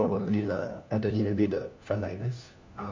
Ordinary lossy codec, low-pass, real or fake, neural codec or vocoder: MP3, 48 kbps; 7.2 kHz; fake; codec, 16 kHz, 1 kbps, FunCodec, trained on LibriTTS, 50 frames a second